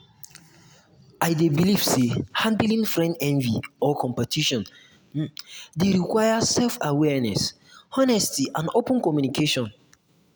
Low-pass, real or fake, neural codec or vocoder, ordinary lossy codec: none; real; none; none